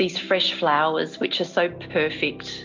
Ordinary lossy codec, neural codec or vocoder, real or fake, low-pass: MP3, 64 kbps; none; real; 7.2 kHz